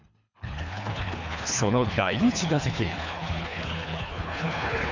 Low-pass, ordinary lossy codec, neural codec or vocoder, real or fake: 7.2 kHz; none; codec, 24 kHz, 3 kbps, HILCodec; fake